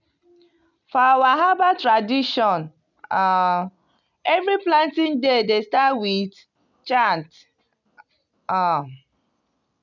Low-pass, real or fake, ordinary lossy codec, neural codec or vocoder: 7.2 kHz; real; none; none